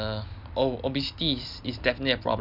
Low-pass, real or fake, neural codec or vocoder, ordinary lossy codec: 5.4 kHz; real; none; none